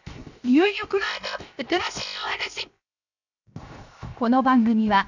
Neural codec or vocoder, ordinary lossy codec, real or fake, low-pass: codec, 16 kHz, 0.7 kbps, FocalCodec; none; fake; 7.2 kHz